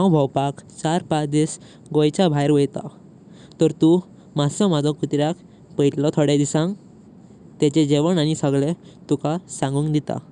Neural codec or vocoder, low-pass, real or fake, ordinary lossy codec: none; none; real; none